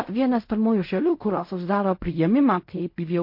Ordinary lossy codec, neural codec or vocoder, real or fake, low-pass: MP3, 32 kbps; codec, 16 kHz in and 24 kHz out, 0.4 kbps, LongCat-Audio-Codec, fine tuned four codebook decoder; fake; 5.4 kHz